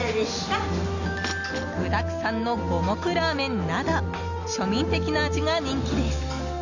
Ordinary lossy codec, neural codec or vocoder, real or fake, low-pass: none; none; real; 7.2 kHz